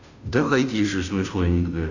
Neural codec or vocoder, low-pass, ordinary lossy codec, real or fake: codec, 16 kHz, 0.5 kbps, FunCodec, trained on Chinese and English, 25 frames a second; 7.2 kHz; none; fake